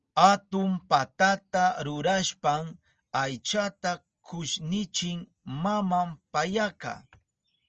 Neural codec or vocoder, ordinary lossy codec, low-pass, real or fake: none; Opus, 24 kbps; 7.2 kHz; real